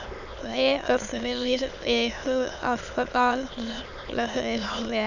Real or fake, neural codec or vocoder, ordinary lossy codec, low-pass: fake; autoencoder, 22.05 kHz, a latent of 192 numbers a frame, VITS, trained on many speakers; none; 7.2 kHz